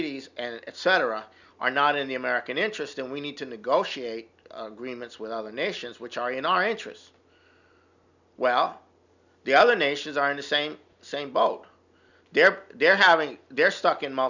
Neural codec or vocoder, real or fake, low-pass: none; real; 7.2 kHz